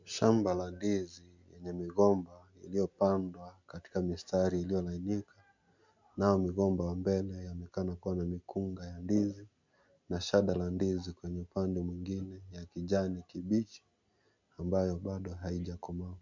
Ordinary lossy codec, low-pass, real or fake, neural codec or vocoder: MP3, 64 kbps; 7.2 kHz; real; none